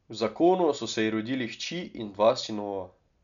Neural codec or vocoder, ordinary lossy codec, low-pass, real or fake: none; none; 7.2 kHz; real